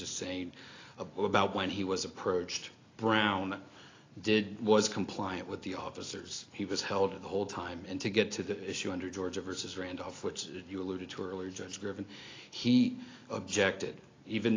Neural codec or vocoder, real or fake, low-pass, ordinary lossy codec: none; real; 7.2 kHz; AAC, 32 kbps